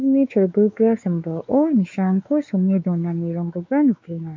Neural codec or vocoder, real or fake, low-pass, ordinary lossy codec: codec, 16 kHz, 4 kbps, X-Codec, WavLM features, trained on Multilingual LibriSpeech; fake; 7.2 kHz; MP3, 48 kbps